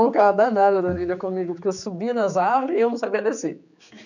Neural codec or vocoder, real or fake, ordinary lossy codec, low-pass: codec, 16 kHz, 4 kbps, X-Codec, HuBERT features, trained on general audio; fake; none; 7.2 kHz